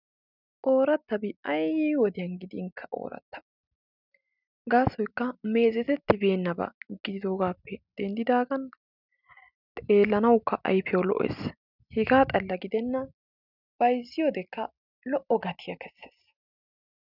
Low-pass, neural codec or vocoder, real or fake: 5.4 kHz; none; real